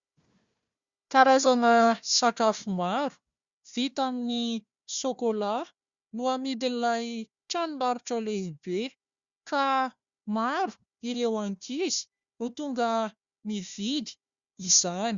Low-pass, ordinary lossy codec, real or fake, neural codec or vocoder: 7.2 kHz; Opus, 64 kbps; fake; codec, 16 kHz, 1 kbps, FunCodec, trained on Chinese and English, 50 frames a second